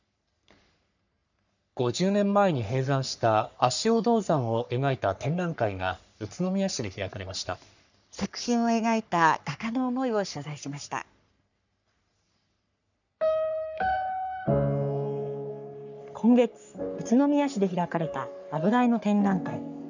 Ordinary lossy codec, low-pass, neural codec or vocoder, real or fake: none; 7.2 kHz; codec, 44.1 kHz, 3.4 kbps, Pupu-Codec; fake